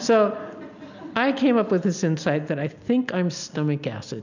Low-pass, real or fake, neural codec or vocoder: 7.2 kHz; real; none